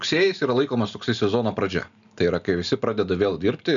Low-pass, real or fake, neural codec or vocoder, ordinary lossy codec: 7.2 kHz; real; none; MP3, 96 kbps